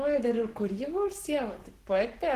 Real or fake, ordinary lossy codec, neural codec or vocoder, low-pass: fake; Opus, 16 kbps; codec, 44.1 kHz, 7.8 kbps, Pupu-Codec; 14.4 kHz